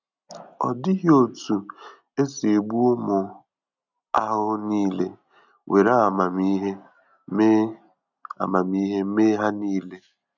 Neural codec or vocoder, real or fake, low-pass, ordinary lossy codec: none; real; 7.2 kHz; none